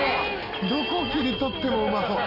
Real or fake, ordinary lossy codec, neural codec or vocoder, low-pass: real; Opus, 64 kbps; none; 5.4 kHz